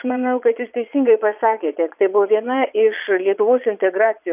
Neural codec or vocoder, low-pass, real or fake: vocoder, 22.05 kHz, 80 mel bands, Vocos; 3.6 kHz; fake